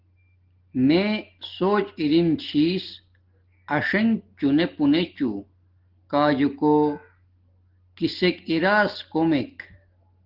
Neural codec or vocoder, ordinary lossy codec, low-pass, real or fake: none; Opus, 16 kbps; 5.4 kHz; real